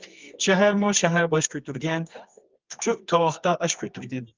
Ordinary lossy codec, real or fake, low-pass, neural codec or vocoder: Opus, 24 kbps; fake; 7.2 kHz; codec, 24 kHz, 0.9 kbps, WavTokenizer, medium music audio release